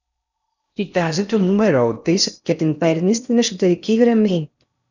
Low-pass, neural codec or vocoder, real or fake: 7.2 kHz; codec, 16 kHz in and 24 kHz out, 0.6 kbps, FocalCodec, streaming, 4096 codes; fake